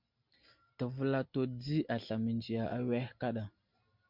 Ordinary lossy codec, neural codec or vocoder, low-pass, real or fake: MP3, 48 kbps; none; 5.4 kHz; real